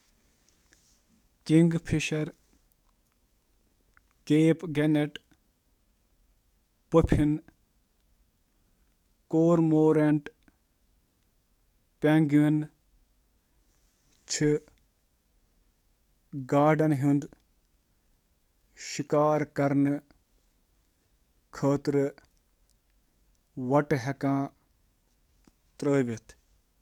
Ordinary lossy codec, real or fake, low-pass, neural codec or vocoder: MP3, 96 kbps; fake; 19.8 kHz; codec, 44.1 kHz, 7.8 kbps, DAC